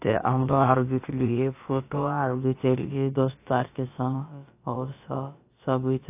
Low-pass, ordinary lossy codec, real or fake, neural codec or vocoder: 3.6 kHz; AAC, 24 kbps; fake; codec, 16 kHz, about 1 kbps, DyCAST, with the encoder's durations